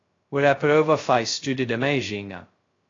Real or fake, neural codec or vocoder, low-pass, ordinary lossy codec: fake; codec, 16 kHz, 0.2 kbps, FocalCodec; 7.2 kHz; AAC, 32 kbps